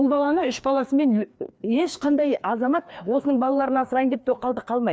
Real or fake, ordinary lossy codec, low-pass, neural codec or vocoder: fake; none; none; codec, 16 kHz, 2 kbps, FreqCodec, larger model